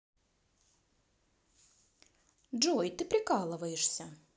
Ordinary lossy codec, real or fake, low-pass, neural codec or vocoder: none; real; none; none